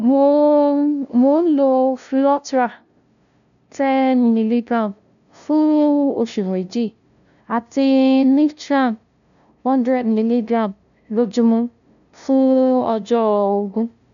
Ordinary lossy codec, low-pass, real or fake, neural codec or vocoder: none; 7.2 kHz; fake; codec, 16 kHz, 0.5 kbps, FunCodec, trained on LibriTTS, 25 frames a second